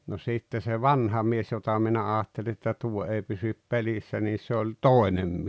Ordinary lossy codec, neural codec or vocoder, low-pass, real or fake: none; none; none; real